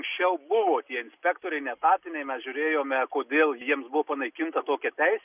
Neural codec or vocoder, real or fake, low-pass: none; real; 3.6 kHz